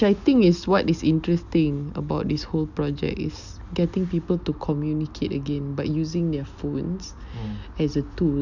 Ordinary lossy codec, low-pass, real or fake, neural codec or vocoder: none; 7.2 kHz; real; none